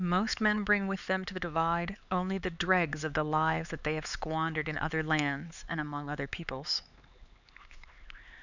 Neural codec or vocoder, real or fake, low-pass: codec, 16 kHz, 4 kbps, X-Codec, HuBERT features, trained on LibriSpeech; fake; 7.2 kHz